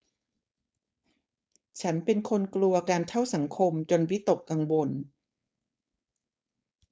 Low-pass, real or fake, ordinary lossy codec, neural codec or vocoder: none; fake; none; codec, 16 kHz, 4.8 kbps, FACodec